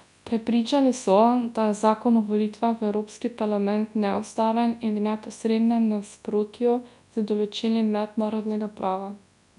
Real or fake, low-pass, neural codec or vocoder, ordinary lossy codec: fake; 10.8 kHz; codec, 24 kHz, 0.9 kbps, WavTokenizer, large speech release; none